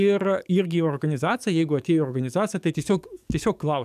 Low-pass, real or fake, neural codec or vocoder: 14.4 kHz; fake; codec, 44.1 kHz, 7.8 kbps, DAC